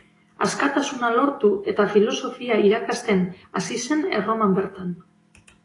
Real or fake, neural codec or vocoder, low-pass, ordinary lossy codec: fake; autoencoder, 48 kHz, 128 numbers a frame, DAC-VAE, trained on Japanese speech; 10.8 kHz; AAC, 32 kbps